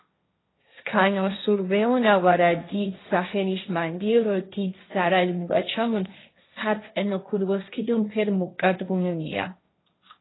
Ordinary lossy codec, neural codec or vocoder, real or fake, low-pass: AAC, 16 kbps; codec, 16 kHz, 1.1 kbps, Voila-Tokenizer; fake; 7.2 kHz